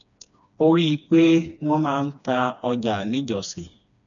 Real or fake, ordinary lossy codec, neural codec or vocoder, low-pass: fake; MP3, 96 kbps; codec, 16 kHz, 2 kbps, FreqCodec, smaller model; 7.2 kHz